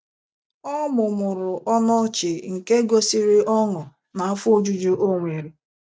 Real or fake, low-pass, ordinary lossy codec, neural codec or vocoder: real; none; none; none